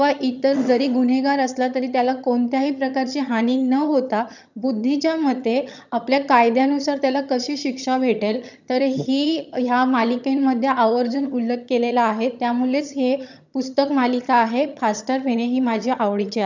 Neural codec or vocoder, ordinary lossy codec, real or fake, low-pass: vocoder, 22.05 kHz, 80 mel bands, HiFi-GAN; none; fake; 7.2 kHz